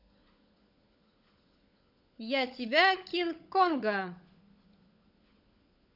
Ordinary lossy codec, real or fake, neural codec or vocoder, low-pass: none; fake; codec, 16 kHz, 16 kbps, FunCodec, trained on LibriTTS, 50 frames a second; 5.4 kHz